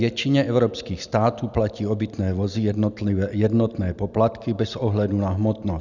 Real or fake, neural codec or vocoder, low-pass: real; none; 7.2 kHz